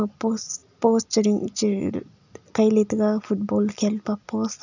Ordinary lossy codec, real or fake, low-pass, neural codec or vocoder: none; real; 7.2 kHz; none